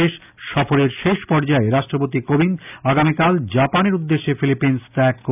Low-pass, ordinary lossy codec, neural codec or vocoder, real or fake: 3.6 kHz; none; none; real